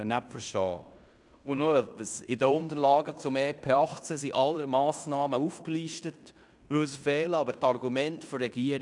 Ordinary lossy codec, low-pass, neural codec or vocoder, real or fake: none; 10.8 kHz; codec, 16 kHz in and 24 kHz out, 0.9 kbps, LongCat-Audio-Codec, fine tuned four codebook decoder; fake